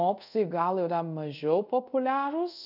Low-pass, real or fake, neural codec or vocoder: 5.4 kHz; fake; codec, 24 kHz, 0.5 kbps, DualCodec